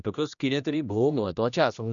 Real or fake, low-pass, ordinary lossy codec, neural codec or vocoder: fake; 7.2 kHz; none; codec, 16 kHz, 1 kbps, X-Codec, HuBERT features, trained on general audio